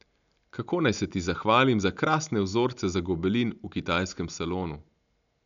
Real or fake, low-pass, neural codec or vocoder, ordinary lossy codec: real; 7.2 kHz; none; none